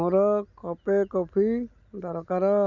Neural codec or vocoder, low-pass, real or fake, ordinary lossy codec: none; 7.2 kHz; real; none